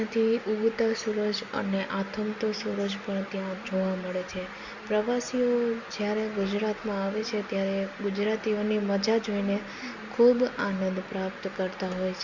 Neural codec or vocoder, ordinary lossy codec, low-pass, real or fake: none; Opus, 64 kbps; 7.2 kHz; real